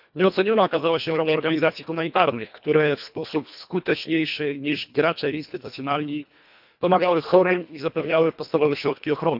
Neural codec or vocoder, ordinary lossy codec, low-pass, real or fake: codec, 24 kHz, 1.5 kbps, HILCodec; none; 5.4 kHz; fake